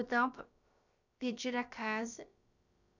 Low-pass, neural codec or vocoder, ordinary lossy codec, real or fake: 7.2 kHz; codec, 16 kHz, about 1 kbps, DyCAST, with the encoder's durations; none; fake